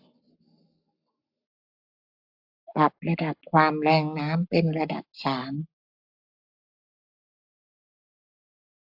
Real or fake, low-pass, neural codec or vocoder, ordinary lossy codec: fake; 5.4 kHz; codec, 44.1 kHz, 7.8 kbps, Pupu-Codec; AAC, 48 kbps